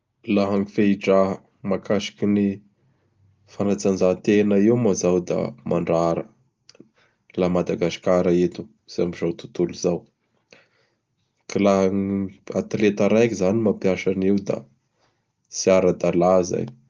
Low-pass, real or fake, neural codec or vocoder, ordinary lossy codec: 7.2 kHz; real; none; Opus, 32 kbps